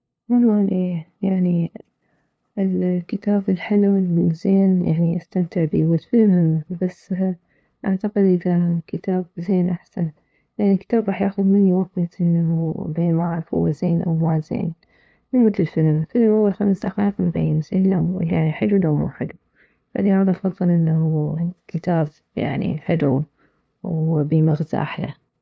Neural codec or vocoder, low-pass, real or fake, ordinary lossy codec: codec, 16 kHz, 2 kbps, FunCodec, trained on LibriTTS, 25 frames a second; none; fake; none